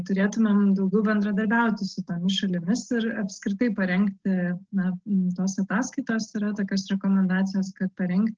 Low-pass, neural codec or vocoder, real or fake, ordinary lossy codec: 7.2 kHz; none; real; Opus, 16 kbps